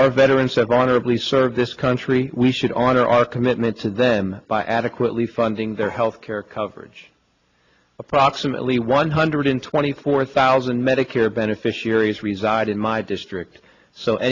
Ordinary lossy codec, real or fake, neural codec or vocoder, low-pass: AAC, 48 kbps; real; none; 7.2 kHz